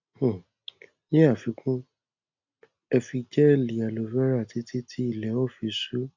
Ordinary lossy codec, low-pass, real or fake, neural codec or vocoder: none; 7.2 kHz; real; none